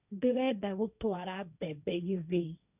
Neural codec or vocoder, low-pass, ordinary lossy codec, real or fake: codec, 16 kHz, 1.1 kbps, Voila-Tokenizer; 3.6 kHz; none; fake